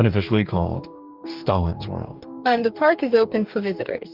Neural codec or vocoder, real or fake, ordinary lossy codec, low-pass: codec, 44.1 kHz, 2.6 kbps, DAC; fake; Opus, 32 kbps; 5.4 kHz